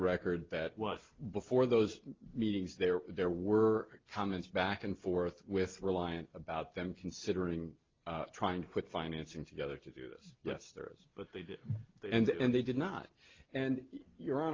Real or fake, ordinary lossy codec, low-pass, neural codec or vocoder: real; Opus, 32 kbps; 7.2 kHz; none